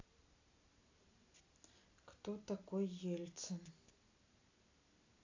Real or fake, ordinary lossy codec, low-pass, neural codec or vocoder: real; AAC, 48 kbps; 7.2 kHz; none